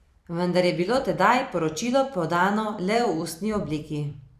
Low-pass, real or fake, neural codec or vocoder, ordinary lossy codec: 14.4 kHz; fake; vocoder, 44.1 kHz, 128 mel bands every 256 samples, BigVGAN v2; none